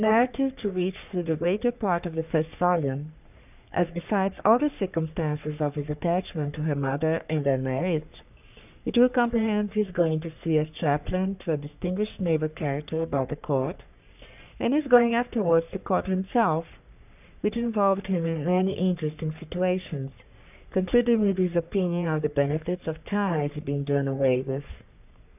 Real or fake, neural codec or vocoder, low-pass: fake; codec, 44.1 kHz, 3.4 kbps, Pupu-Codec; 3.6 kHz